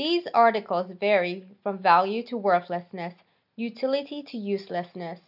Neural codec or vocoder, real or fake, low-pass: none; real; 5.4 kHz